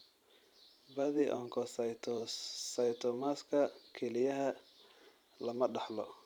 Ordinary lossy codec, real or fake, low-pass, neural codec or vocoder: none; fake; 19.8 kHz; vocoder, 44.1 kHz, 128 mel bands every 512 samples, BigVGAN v2